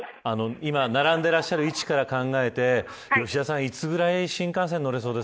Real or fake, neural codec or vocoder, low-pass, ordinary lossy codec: real; none; none; none